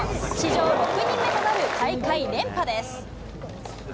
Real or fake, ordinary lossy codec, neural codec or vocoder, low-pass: real; none; none; none